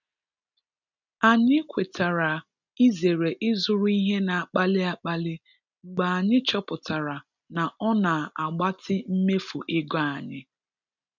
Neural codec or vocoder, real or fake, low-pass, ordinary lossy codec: none; real; 7.2 kHz; none